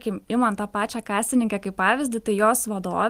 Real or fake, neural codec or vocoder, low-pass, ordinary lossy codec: real; none; 14.4 kHz; Opus, 24 kbps